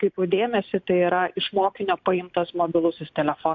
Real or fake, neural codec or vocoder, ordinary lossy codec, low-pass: real; none; MP3, 48 kbps; 7.2 kHz